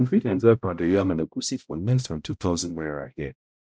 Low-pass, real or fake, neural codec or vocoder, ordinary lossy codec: none; fake; codec, 16 kHz, 0.5 kbps, X-Codec, HuBERT features, trained on balanced general audio; none